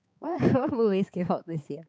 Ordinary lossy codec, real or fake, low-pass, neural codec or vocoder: none; fake; none; codec, 16 kHz, 4 kbps, X-Codec, HuBERT features, trained on balanced general audio